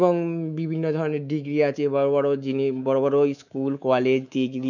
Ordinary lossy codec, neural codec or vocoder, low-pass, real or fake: none; codec, 24 kHz, 3.1 kbps, DualCodec; 7.2 kHz; fake